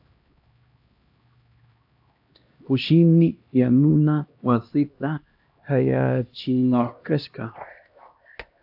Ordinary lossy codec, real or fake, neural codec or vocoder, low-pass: AAC, 48 kbps; fake; codec, 16 kHz, 1 kbps, X-Codec, HuBERT features, trained on LibriSpeech; 5.4 kHz